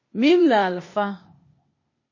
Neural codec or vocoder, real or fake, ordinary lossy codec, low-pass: codec, 16 kHz, 0.8 kbps, ZipCodec; fake; MP3, 32 kbps; 7.2 kHz